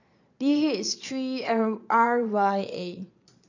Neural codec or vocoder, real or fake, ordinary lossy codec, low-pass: vocoder, 22.05 kHz, 80 mel bands, Vocos; fake; none; 7.2 kHz